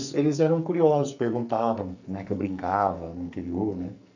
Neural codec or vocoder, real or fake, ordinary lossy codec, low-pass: codec, 44.1 kHz, 2.6 kbps, SNAC; fake; none; 7.2 kHz